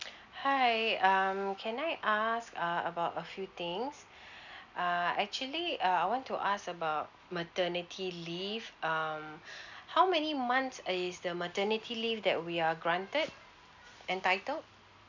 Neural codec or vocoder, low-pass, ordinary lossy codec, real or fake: none; 7.2 kHz; none; real